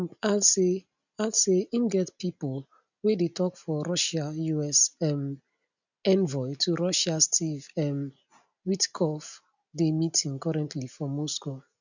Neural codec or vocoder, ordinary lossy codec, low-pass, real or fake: none; none; 7.2 kHz; real